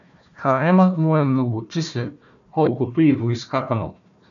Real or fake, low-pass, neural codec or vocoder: fake; 7.2 kHz; codec, 16 kHz, 1 kbps, FunCodec, trained on Chinese and English, 50 frames a second